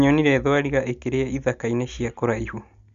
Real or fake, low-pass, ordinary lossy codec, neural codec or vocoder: real; 7.2 kHz; Opus, 64 kbps; none